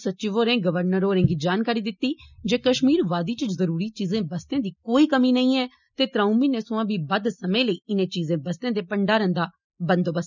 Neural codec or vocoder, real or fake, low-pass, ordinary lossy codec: none; real; 7.2 kHz; none